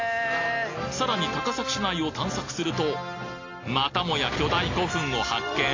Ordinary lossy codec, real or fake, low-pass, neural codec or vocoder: AAC, 32 kbps; real; 7.2 kHz; none